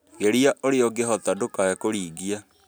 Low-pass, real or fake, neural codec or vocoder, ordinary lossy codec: none; real; none; none